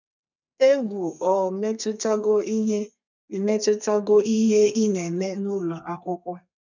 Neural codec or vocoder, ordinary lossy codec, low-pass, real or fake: codec, 32 kHz, 1.9 kbps, SNAC; none; 7.2 kHz; fake